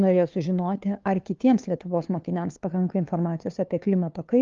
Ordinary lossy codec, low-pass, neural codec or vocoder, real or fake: Opus, 32 kbps; 7.2 kHz; codec, 16 kHz, 2 kbps, FunCodec, trained on LibriTTS, 25 frames a second; fake